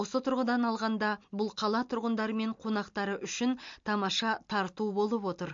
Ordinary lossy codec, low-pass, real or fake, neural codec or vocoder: MP3, 48 kbps; 7.2 kHz; real; none